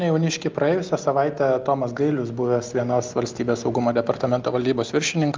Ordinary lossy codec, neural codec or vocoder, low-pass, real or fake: Opus, 32 kbps; none; 7.2 kHz; real